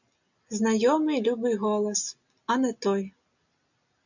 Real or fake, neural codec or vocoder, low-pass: real; none; 7.2 kHz